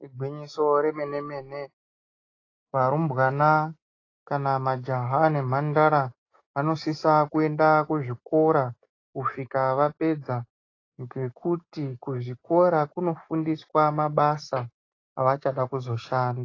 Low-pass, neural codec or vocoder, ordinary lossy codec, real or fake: 7.2 kHz; none; AAC, 32 kbps; real